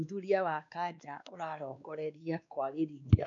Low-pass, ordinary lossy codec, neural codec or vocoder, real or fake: 7.2 kHz; none; codec, 16 kHz, 2 kbps, X-Codec, WavLM features, trained on Multilingual LibriSpeech; fake